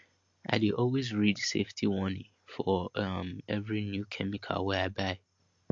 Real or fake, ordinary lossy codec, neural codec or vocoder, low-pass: real; MP3, 48 kbps; none; 7.2 kHz